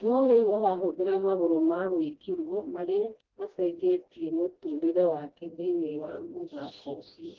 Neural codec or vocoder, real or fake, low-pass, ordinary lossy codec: codec, 16 kHz, 1 kbps, FreqCodec, smaller model; fake; 7.2 kHz; Opus, 16 kbps